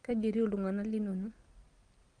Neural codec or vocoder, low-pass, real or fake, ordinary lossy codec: none; 9.9 kHz; real; Opus, 32 kbps